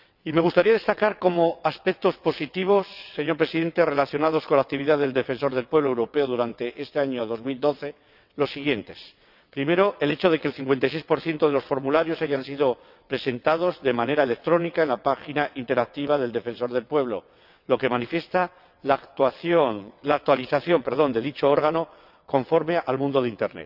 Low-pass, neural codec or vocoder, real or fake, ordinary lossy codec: 5.4 kHz; vocoder, 22.05 kHz, 80 mel bands, WaveNeXt; fake; none